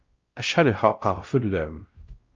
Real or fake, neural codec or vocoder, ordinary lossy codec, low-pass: fake; codec, 16 kHz, 0.5 kbps, X-Codec, WavLM features, trained on Multilingual LibriSpeech; Opus, 24 kbps; 7.2 kHz